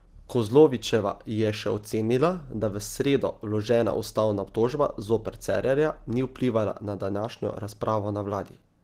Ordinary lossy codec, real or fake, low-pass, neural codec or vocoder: Opus, 16 kbps; real; 14.4 kHz; none